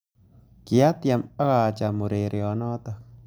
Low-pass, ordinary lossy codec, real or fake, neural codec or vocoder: none; none; real; none